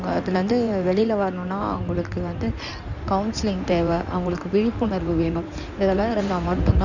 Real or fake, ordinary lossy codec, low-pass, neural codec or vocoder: fake; none; 7.2 kHz; codec, 16 kHz in and 24 kHz out, 2.2 kbps, FireRedTTS-2 codec